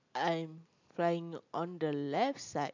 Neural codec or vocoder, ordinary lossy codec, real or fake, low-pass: none; MP3, 64 kbps; real; 7.2 kHz